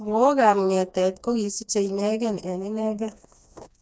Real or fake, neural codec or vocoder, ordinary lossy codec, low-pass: fake; codec, 16 kHz, 2 kbps, FreqCodec, smaller model; none; none